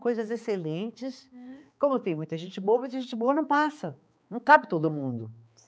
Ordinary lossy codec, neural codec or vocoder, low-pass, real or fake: none; codec, 16 kHz, 4 kbps, X-Codec, HuBERT features, trained on balanced general audio; none; fake